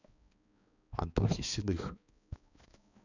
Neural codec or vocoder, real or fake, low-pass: codec, 16 kHz, 2 kbps, X-Codec, HuBERT features, trained on balanced general audio; fake; 7.2 kHz